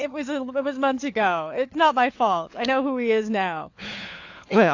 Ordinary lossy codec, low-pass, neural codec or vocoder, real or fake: AAC, 48 kbps; 7.2 kHz; codec, 16 kHz, 4 kbps, FunCodec, trained on LibriTTS, 50 frames a second; fake